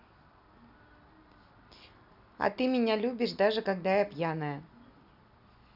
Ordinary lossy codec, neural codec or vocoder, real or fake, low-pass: none; none; real; 5.4 kHz